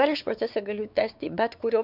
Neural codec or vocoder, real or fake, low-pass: codec, 16 kHz, 4 kbps, X-Codec, WavLM features, trained on Multilingual LibriSpeech; fake; 5.4 kHz